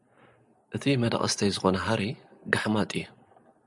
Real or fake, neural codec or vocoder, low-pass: real; none; 10.8 kHz